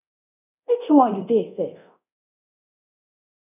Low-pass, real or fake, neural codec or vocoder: 3.6 kHz; fake; codec, 24 kHz, 0.9 kbps, DualCodec